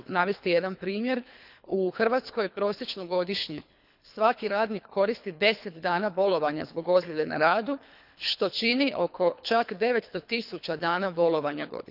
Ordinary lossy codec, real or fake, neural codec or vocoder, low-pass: none; fake; codec, 24 kHz, 3 kbps, HILCodec; 5.4 kHz